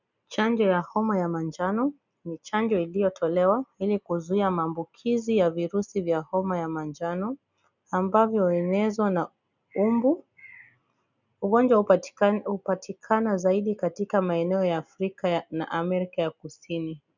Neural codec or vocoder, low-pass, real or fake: none; 7.2 kHz; real